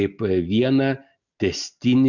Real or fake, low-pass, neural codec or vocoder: real; 7.2 kHz; none